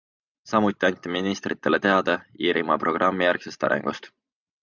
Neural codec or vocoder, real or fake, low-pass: none; real; 7.2 kHz